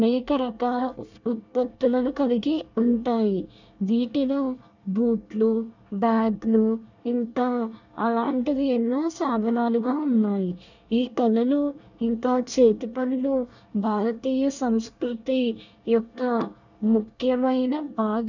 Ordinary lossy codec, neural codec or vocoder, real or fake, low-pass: none; codec, 24 kHz, 1 kbps, SNAC; fake; 7.2 kHz